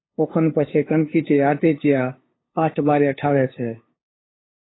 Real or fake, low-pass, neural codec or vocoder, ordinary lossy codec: fake; 7.2 kHz; codec, 16 kHz, 2 kbps, FunCodec, trained on LibriTTS, 25 frames a second; AAC, 16 kbps